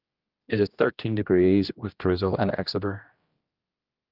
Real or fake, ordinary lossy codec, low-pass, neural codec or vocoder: fake; Opus, 32 kbps; 5.4 kHz; codec, 16 kHz, 1 kbps, X-Codec, HuBERT features, trained on general audio